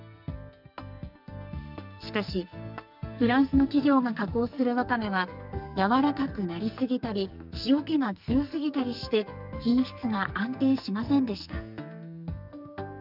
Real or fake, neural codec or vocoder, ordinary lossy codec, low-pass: fake; codec, 44.1 kHz, 2.6 kbps, SNAC; none; 5.4 kHz